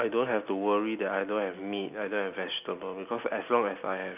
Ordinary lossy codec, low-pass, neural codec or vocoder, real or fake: none; 3.6 kHz; none; real